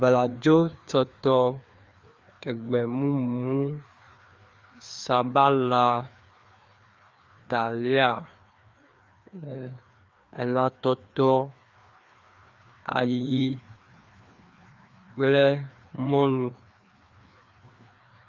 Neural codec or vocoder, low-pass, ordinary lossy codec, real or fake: codec, 16 kHz, 2 kbps, FreqCodec, larger model; 7.2 kHz; Opus, 32 kbps; fake